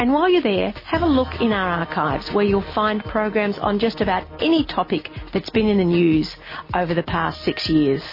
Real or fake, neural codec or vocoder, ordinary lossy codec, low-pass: real; none; MP3, 24 kbps; 5.4 kHz